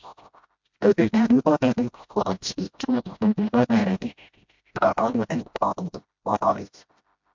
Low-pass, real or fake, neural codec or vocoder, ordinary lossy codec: 7.2 kHz; fake; codec, 16 kHz, 0.5 kbps, FreqCodec, smaller model; MP3, 64 kbps